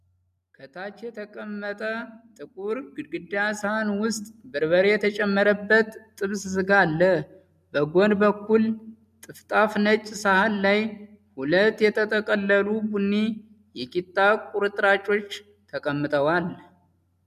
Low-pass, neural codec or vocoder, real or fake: 14.4 kHz; none; real